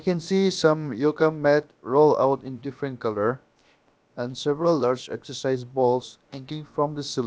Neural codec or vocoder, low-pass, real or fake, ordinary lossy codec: codec, 16 kHz, about 1 kbps, DyCAST, with the encoder's durations; none; fake; none